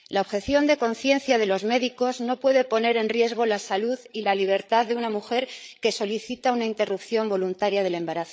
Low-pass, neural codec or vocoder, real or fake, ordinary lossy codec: none; codec, 16 kHz, 8 kbps, FreqCodec, larger model; fake; none